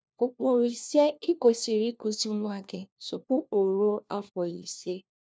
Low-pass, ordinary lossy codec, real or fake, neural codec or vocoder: none; none; fake; codec, 16 kHz, 1 kbps, FunCodec, trained on LibriTTS, 50 frames a second